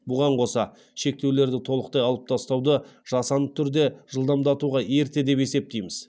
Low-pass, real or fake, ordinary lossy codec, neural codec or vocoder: none; real; none; none